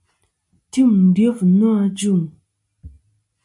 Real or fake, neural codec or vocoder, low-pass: real; none; 10.8 kHz